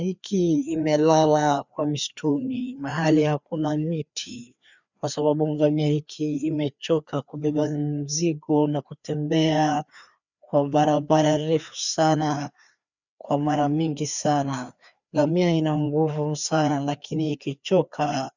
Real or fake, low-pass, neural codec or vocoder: fake; 7.2 kHz; codec, 16 kHz, 2 kbps, FreqCodec, larger model